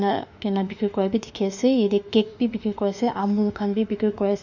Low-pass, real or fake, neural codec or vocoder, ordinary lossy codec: 7.2 kHz; fake; autoencoder, 48 kHz, 32 numbers a frame, DAC-VAE, trained on Japanese speech; none